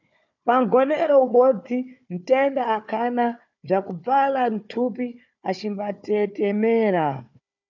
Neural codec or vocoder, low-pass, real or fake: codec, 16 kHz, 4 kbps, FunCodec, trained on Chinese and English, 50 frames a second; 7.2 kHz; fake